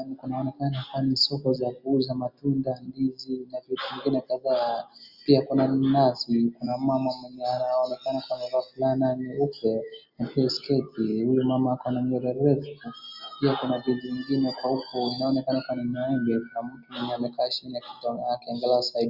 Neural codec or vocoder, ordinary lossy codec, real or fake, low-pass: none; Opus, 64 kbps; real; 5.4 kHz